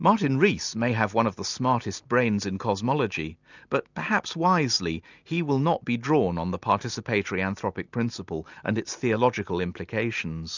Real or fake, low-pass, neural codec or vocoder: real; 7.2 kHz; none